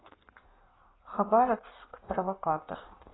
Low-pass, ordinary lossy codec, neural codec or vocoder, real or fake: 7.2 kHz; AAC, 16 kbps; codec, 16 kHz in and 24 kHz out, 1.1 kbps, FireRedTTS-2 codec; fake